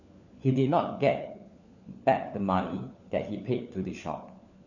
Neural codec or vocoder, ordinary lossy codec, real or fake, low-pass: codec, 16 kHz, 4 kbps, FunCodec, trained on LibriTTS, 50 frames a second; none; fake; 7.2 kHz